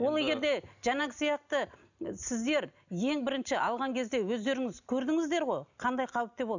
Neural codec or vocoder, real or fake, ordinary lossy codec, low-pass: none; real; none; 7.2 kHz